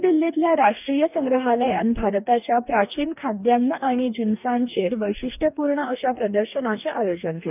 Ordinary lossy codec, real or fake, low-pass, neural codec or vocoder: none; fake; 3.6 kHz; codec, 44.1 kHz, 2.6 kbps, DAC